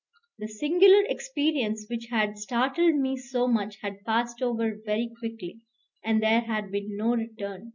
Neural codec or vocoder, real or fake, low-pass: none; real; 7.2 kHz